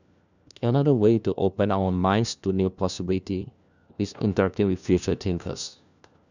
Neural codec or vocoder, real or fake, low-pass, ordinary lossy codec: codec, 16 kHz, 1 kbps, FunCodec, trained on LibriTTS, 50 frames a second; fake; 7.2 kHz; none